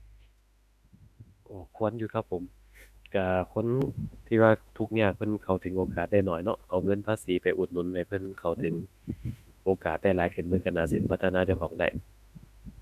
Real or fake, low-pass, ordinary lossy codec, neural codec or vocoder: fake; 14.4 kHz; none; autoencoder, 48 kHz, 32 numbers a frame, DAC-VAE, trained on Japanese speech